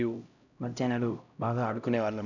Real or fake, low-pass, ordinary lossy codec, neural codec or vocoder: fake; 7.2 kHz; none; codec, 16 kHz, 0.5 kbps, X-Codec, HuBERT features, trained on LibriSpeech